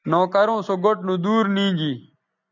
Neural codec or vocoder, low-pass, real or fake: none; 7.2 kHz; real